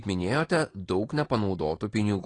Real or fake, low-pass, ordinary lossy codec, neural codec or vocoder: real; 9.9 kHz; AAC, 32 kbps; none